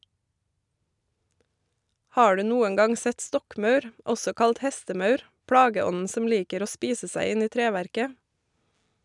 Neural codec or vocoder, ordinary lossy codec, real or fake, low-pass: none; none; real; 10.8 kHz